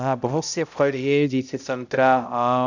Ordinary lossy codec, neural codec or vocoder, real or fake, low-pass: none; codec, 16 kHz, 0.5 kbps, X-Codec, HuBERT features, trained on balanced general audio; fake; 7.2 kHz